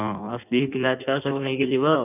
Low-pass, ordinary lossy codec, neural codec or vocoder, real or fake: 3.6 kHz; none; codec, 16 kHz in and 24 kHz out, 1.1 kbps, FireRedTTS-2 codec; fake